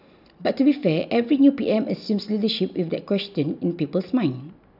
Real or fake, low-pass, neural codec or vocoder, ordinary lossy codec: real; 5.4 kHz; none; none